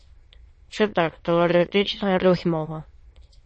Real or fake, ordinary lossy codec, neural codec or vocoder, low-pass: fake; MP3, 32 kbps; autoencoder, 22.05 kHz, a latent of 192 numbers a frame, VITS, trained on many speakers; 9.9 kHz